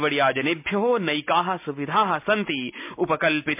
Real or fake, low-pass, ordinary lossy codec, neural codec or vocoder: real; 3.6 kHz; MP3, 32 kbps; none